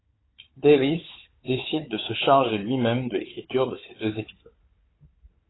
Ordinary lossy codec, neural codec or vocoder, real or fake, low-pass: AAC, 16 kbps; codec, 16 kHz, 4 kbps, FunCodec, trained on Chinese and English, 50 frames a second; fake; 7.2 kHz